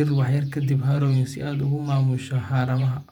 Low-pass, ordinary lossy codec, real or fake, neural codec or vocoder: 19.8 kHz; none; fake; vocoder, 48 kHz, 128 mel bands, Vocos